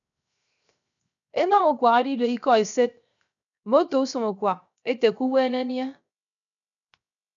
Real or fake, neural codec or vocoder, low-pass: fake; codec, 16 kHz, 0.7 kbps, FocalCodec; 7.2 kHz